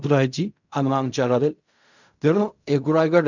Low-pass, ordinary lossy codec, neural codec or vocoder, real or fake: 7.2 kHz; none; codec, 16 kHz in and 24 kHz out, 0.4 kbps, LongCat-Audio-Codec, fine tuned four codebook decoder; fake